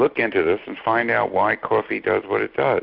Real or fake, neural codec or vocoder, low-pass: real; none; 5.4 kHz